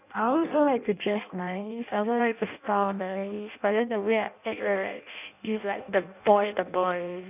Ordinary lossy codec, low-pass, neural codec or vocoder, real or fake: none; 3.6 kHz; codec, 16 kHz in and 24 kHz out, 0.6 kbps, FireRedTTS-2 codec; fake